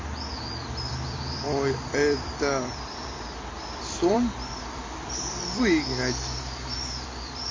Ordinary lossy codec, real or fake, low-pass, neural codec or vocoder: MP3, 32 kbps; fake; 7.2 kHz; vocoder, 44.1 kHz, 128 mel bands every 256 samples, BigVGAN v2